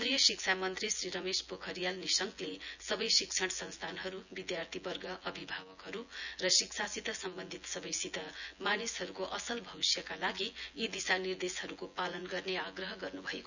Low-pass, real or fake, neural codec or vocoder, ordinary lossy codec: 7.2 kHz; fake; vocoder, 24 kHz, 100 mel bands, Vocos; none